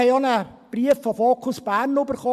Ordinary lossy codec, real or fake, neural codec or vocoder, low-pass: AAC, 96 kbps; real; none; 14.4 kHz